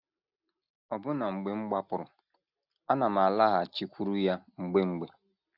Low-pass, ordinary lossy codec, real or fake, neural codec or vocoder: 5.4 kHz; none; real; none